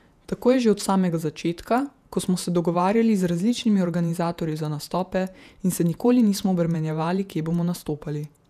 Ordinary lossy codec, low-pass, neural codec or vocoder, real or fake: none; 14.4 kHz; vocoder, 48 kHz, 128 mel bands, Vocos; fake